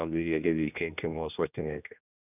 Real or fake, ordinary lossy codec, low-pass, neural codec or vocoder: fake; none; 3.6 kHz; codec, 16 kHz, 1 kbps, X-Codec, HuBERT features, trained on general audio